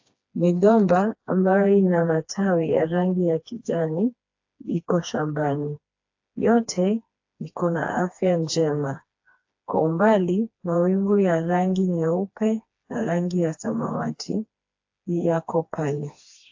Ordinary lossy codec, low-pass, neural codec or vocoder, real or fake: AAC, 48 kbps; 7.2 kHz; codec, 16 kHz, 2 kbps, FreqCodec, smaller model; fake